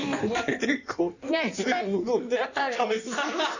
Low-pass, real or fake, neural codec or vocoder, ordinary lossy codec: 7.2 kHz; fake; codec, 16 kHz in and 24 kHz out, 1.1 kbps, FireRedTTS-2 codec; none